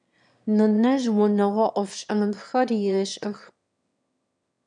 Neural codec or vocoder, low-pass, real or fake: autoencoder, 22.05 kHz, a latent of 192 numbers a frame, VITS, trained on one speaker; 9.9 kHz; fake